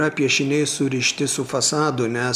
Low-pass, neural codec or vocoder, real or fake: 14.4 kHz; none; real